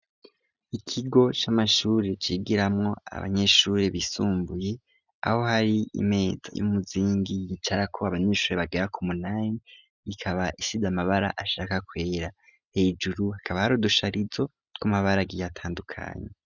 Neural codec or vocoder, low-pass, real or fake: none; 7.2 kHz; real